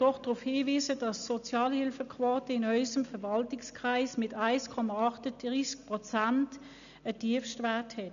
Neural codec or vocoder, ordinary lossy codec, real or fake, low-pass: none; MP3, 96 kbps; real; 7.2 kHz